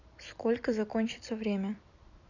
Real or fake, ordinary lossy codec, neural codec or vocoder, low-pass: fake; none; vocoder, 44.1 kHz, 128 mel bands every 256 samples, BigVGAN v2; 7.2 kHz